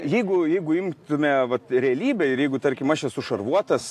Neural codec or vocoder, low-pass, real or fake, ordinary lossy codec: none; 14.4 kHz; real; AAC, 64 kbps